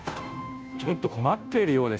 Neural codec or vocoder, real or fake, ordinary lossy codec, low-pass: codec, 16 kHz, 0.5 kbps, FunCodec, trained on Chinese and English, 25 frames a second; fake; none; none